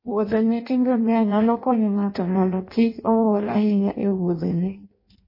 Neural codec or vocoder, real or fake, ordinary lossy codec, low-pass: codec, 16 kHz in and 24 kHz out, 0.6 kbps, FireRedTTS-2 codec; fake; MP3, 24 kbps; 5.4 kHz